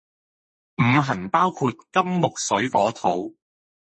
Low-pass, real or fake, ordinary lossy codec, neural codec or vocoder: 10.8 kHz; fake; MP3, 32 kbps; codec, 32 kHz, 1.9 kbps, SNAC